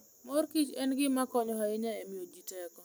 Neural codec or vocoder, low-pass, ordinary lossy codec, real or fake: none; none; none; real